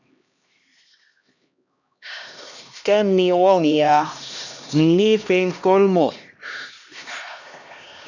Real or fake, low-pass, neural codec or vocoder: fake; 7.2 kHz; codec, 16 kHz, 1 kbps, X-Codec, HuBERT features, trained on LibriSpeech